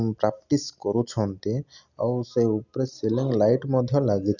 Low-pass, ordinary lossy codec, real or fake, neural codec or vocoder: 7.2 kHz; none; real; none